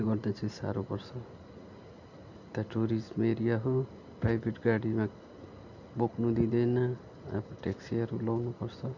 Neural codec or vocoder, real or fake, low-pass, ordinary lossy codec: none; real; 7.2 kHz; none